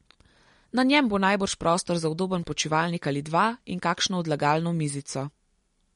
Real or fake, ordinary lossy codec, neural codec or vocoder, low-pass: real; MP3, 48 kbps; none; 10.8 kHz